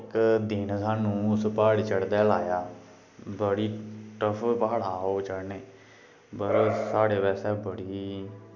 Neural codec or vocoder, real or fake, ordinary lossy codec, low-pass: none; real; none; 7.2 kHz